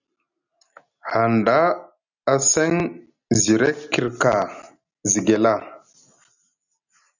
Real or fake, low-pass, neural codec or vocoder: real; 7.2 kHz; none